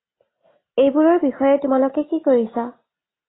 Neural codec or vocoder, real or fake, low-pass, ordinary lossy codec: none; real; 7.2 kHz; AAC, 16 kbps